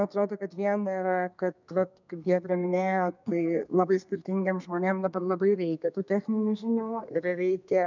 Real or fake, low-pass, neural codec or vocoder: fake; 7.2 kHz; codec, 32 kHz, 1.9 kbps, SNAC